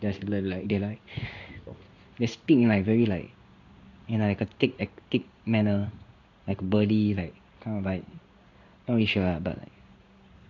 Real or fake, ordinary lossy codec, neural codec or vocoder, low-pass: fake; none; codec, 16 kHz in and 24 kHz out, 1 kbps, XY-Tokenizer; 7.2 kHz